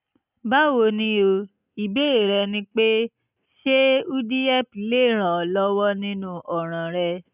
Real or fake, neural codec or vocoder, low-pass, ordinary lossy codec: real; none; 3.6 kHz; none